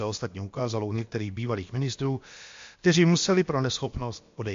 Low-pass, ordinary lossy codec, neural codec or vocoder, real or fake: 7.2 kHz; MP3, 48 kbps; codec, 16 kHz, about 1 kbps, DyCAST, with the encoder's durations; fake